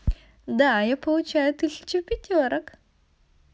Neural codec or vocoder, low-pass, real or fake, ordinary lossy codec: none; none; real; none